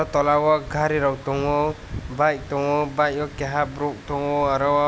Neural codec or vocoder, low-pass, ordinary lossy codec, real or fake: none; none; none; real